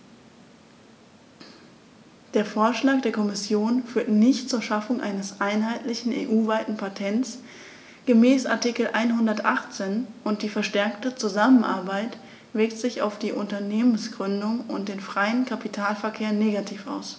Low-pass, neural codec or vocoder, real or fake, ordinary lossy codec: none; none; real; none